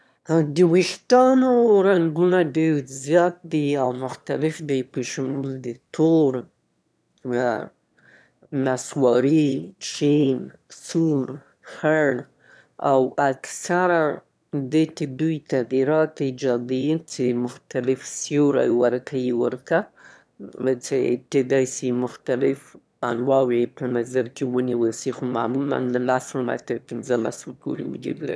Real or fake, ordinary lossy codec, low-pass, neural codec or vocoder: fake; none; none; autoencoder, 22.05 kHz, a latent of 192 numbers a frame, VITS, trained on one speaker